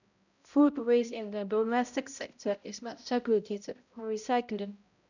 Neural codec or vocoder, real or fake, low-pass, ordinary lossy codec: codec, 16 kHz, 0.5 kbps, X-Codec, HuBERT features, trained on balanced general audio; fake; 7.2 kHz; none